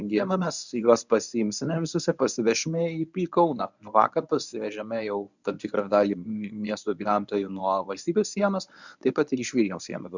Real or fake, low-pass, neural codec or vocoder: fake; 7.2 kHz; codec, 24 kHz, 0.9 kbps, WavTokenizer, medium speech release version 1